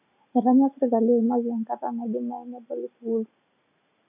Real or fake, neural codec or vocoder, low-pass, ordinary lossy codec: real; none; 3.6 kHz; none